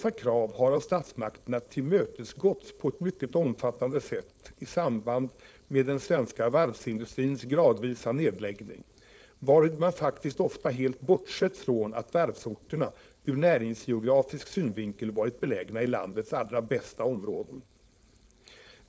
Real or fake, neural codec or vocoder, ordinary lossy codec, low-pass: fake; codec, 16 kHz, 4.8 kbps, FACodec; none; none